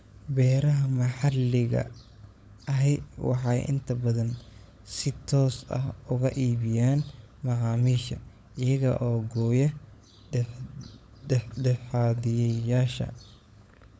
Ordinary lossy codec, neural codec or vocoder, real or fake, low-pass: none; codec, 16 kHz, 16 kbps, FunCodec, trained on LibriTTS, 50 frames a second; fake; none